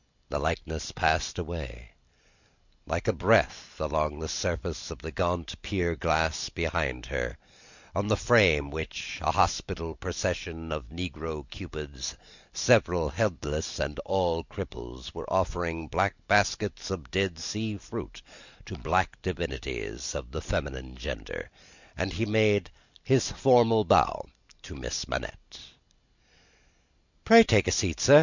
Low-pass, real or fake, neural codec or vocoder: 7.2 kHz; real; none